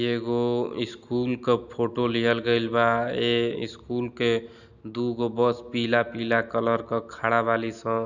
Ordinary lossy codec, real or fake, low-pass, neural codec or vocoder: none; real; 7.2 kHz; none